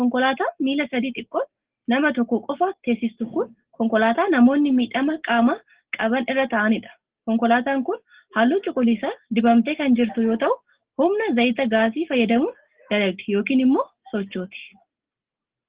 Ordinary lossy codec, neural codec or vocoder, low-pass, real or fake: Opus, 16 kbps; none; 3.6 kHz; real